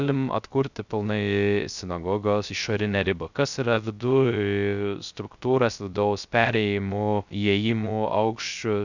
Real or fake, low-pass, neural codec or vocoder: fake; 7.2 kHz; codec, 16 kHz, 0.3 kbps, FocalCodec